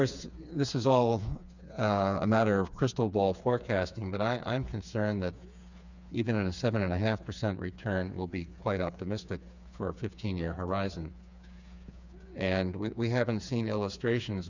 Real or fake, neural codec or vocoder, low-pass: fake; codec, 16 kHz, 4 kbps, FreqCodec, smaller model; 7.2 kHz